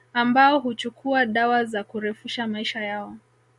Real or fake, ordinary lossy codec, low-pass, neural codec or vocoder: real; Opus, 64 kbps; 10.8 kHz; none